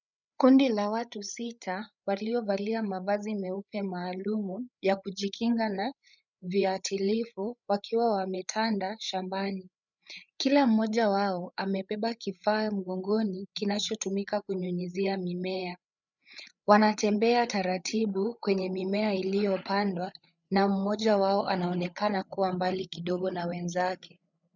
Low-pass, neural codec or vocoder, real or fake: 7.2 kHz; codec, 16 kHz, 8 kbps, FreqCodec, larger model; fake